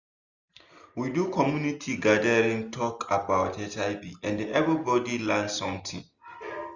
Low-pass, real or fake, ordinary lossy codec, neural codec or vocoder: 7.2 kHz; real; none; none